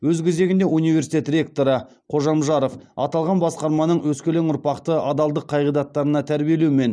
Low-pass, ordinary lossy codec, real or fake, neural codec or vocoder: none; none; real; none